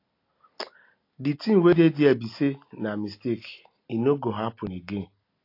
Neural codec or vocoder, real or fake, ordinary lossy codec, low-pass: none; real; AAC, 32 kbps; 5.4 kHz